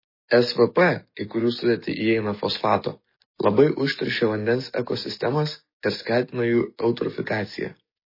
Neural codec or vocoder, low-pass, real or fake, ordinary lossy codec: codec, 44.1 kHz, 7.8 kbps, DAC; 5.4 kHz; fake; MP3, 24 kbps